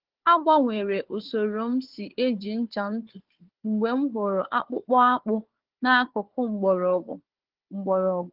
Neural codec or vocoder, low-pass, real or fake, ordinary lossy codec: codec, 16 kHz, 4 kbps, FunCodec, trained on Chinese and English, 50 frames a second; 5.4 kHz; fake; Opus, 16 kbps